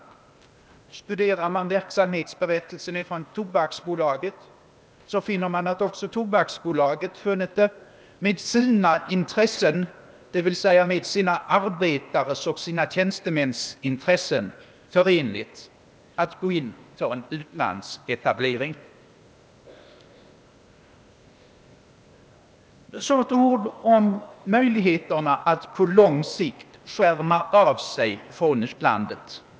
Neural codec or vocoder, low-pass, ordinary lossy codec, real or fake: codec, 16 kHz, 0.8 kbps, ZipCodec; none; none; fake